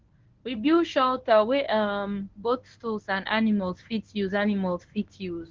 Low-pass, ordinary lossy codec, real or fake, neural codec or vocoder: 7.2 kHz; Opus, 16 kbps; fake; codec, 24 kHz, 0.9 kbps, WavTokenizer, medium speech release version 1